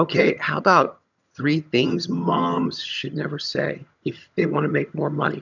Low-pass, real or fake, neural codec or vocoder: 7.2 kHz; fake; vocoder, 22.05 kHz, 80 mel bands, HiFi-GAN